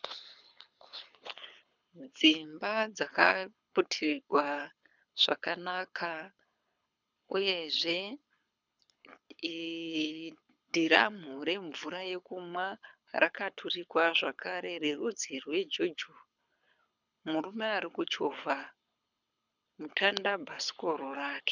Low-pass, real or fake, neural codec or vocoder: 7.2 kHz; fake; codec, 24 kHz, 6 kbps, HILCodec